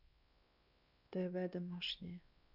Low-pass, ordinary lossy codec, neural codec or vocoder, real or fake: 5.4 kHz; Opus, 64 kbps; codec, 16 kHz, 2 kbps, X-Codec, WavLM features, trained on Multilingual LibriSpeech; fake